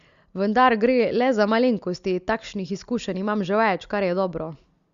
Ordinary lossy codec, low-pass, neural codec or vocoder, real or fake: Opus, 64 kbps; 7.2 kHz; none; real